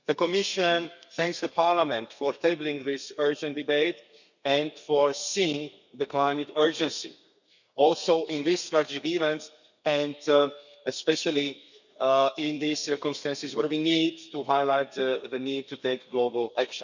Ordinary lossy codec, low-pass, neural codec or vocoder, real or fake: none; 7.2 kHz; codec, 32 kHz, 1.9 kbps, SNAC; fake